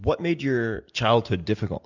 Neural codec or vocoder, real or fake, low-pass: none; real; 7.2 kHz